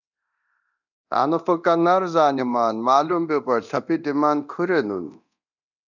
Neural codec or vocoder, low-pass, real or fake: codec, 24 kHz, 0.9 kbps, DualCodec; 7.2 kHz; fake